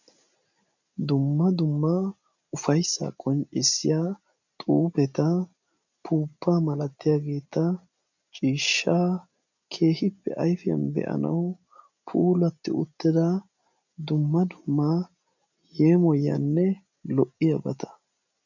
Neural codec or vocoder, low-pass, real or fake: none; 7.2 kHz; real